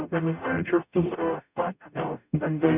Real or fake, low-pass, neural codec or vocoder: fake; 3.6 kHz; codec, 44.1 kHz, 0.9 kbps, DAC